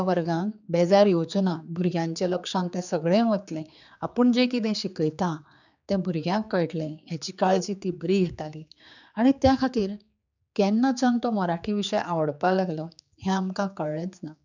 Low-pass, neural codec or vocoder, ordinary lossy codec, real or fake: 7.2 kHz; codec, 16 kHz, 4 kbps, X-Codec, HuBERT features, trained on general audio; none; fake